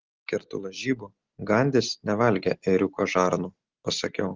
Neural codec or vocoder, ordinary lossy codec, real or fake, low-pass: none; Opus, 16 kbps; real; 7.2 kHz